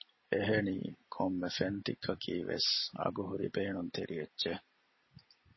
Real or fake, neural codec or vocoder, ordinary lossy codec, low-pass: real; none; MP3, 24 kbps; 7.2 kHz